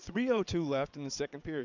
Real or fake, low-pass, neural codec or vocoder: fake; 7.2 kHz; vocoder, 22.05 kHz, 80 mel bands, WaveNeXt